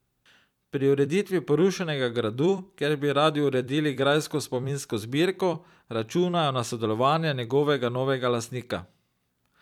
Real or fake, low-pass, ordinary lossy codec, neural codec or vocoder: fake; 19.8 kHz; none; vocoder, 44.1 kHz, 128 mel bands every 256 samples, BigVGAN v2